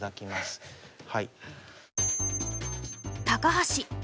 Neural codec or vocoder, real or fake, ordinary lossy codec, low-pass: none; real; none; none